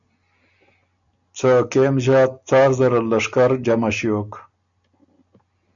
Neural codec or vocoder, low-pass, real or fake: none; 7.2 kHz; real